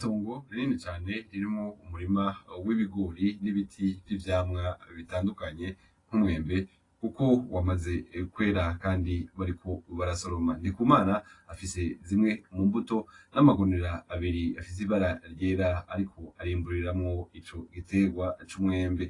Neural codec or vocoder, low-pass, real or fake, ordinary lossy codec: none; 10.8 kHz; real; AAC, 32 kbps